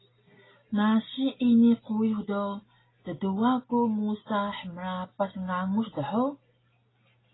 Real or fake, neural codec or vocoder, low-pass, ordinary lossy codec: real; none; 7.2 kHz; AAC, 16 kbps